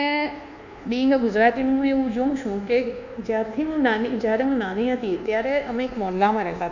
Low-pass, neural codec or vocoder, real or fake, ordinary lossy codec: 7.2 kHz; codec, 24 kHz, 1.2 kbps, DualCodec; fake; none